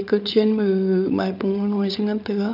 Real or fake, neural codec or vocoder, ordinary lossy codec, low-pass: fake; codec, 16 kHz, 8 kbps, FreqCodec, larger model; none; 5.4 kHz